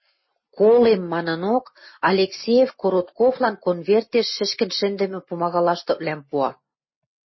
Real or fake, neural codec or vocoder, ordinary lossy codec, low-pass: real; none; MP3, 24 kbps; 7.2 kHz